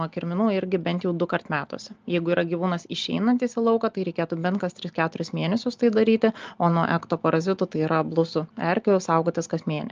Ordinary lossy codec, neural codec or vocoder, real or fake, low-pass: Opus, 24 kbps; none; real; 7.2 kHz